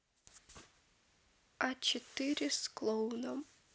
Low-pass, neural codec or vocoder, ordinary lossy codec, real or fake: none; none; none; real